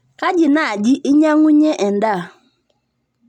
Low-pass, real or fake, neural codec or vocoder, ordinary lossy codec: 19.8 kHz; real; none; none